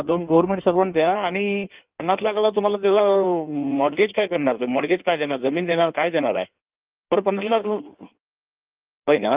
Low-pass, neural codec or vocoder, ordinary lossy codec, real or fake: 3.6 kHz; codec, 16 kHz in and 24 kHz out, 1.1 kbps, FireRedTTS-2 codec; Opus, 32 kbps; fake